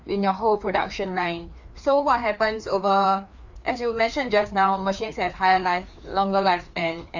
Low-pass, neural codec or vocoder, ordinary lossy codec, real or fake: 7.2 kHz; codec, 16 kHz, 2 kbps, FreqCodec, larger model; Opus, 64 kbps; fake